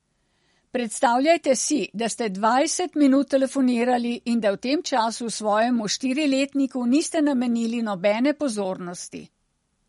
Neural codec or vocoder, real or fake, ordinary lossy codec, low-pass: none; real; MP3, 48 kbps; 19.8 kHz